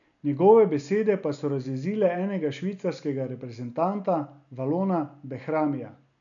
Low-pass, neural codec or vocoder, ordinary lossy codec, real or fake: 7.2 kHz; none; none; real